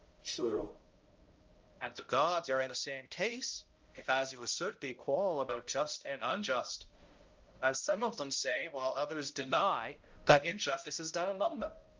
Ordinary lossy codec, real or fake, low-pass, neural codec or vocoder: Opus, 24 kbps; fake; 7.2 kHz; codec, 16 kHz, 0.5 kbps, X-Codec, HuBERT features, trained on balanced general audio